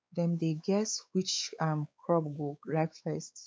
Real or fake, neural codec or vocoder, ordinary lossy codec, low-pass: fake; codec, 16 kHz, 4 kbps, X-Codec, WavLM features, trained on Multilingual LibriSpeech; none; none